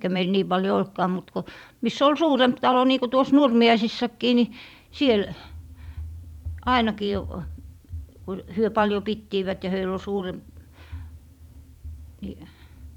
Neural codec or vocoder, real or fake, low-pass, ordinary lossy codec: vocoder, 44.1 kHz, 128 mel bands every 512 samples, BigVGAN v2; fake; 19.8 kHz; none